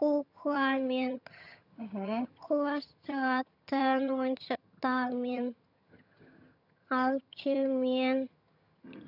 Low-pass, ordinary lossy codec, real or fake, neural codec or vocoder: 5.4 kHz; none; fake; vocoder, 22.05 kHz, 80 mel bands, HiFi-GAN